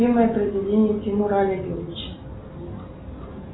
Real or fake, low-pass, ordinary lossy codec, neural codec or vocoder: real; 7.2 kHz; AAC, 16 kbps; none